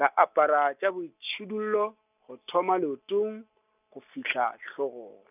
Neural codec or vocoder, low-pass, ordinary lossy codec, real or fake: none; 3.6 kHz; none; real